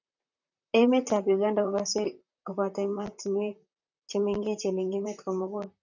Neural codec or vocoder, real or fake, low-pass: vocoder, 44.1 kHz, 128 mel bands, Pupu-Vocoder; fake; 7.2 kHz